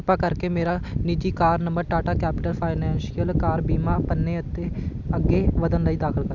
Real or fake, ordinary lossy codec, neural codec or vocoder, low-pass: real; none; none; 7.2 kHz